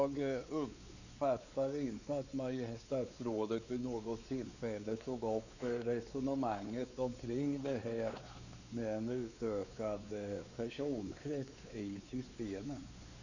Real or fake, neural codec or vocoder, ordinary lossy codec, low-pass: fake; codec, 16 kHz, 4 kbps, X-Codec, WavLM features, trained on Multilingual LibriSpeech; none; 7.2 kHz